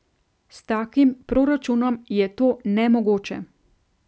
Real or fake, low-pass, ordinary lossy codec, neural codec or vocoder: real; none; none; none